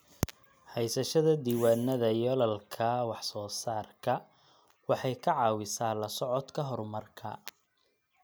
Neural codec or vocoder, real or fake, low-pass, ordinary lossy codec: none; real; none; none